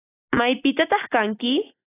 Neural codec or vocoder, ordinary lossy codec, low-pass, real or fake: none; AAC, 16 kbps; 3.6 kHz; real